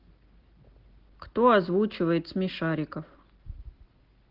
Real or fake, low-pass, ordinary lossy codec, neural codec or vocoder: real; 5.4 kHz; Opus, 16 kbps; none